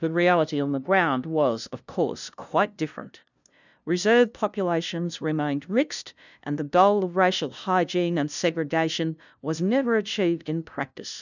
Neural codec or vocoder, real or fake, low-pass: codec, 16 kHz, 0.5 kbps, FunCodec, trained on LibriTTS, 25 frames a second; fake; 7.2 kHz